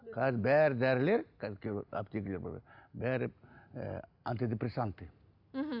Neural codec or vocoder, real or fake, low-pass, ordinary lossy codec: none; real; 5.4 kHz; none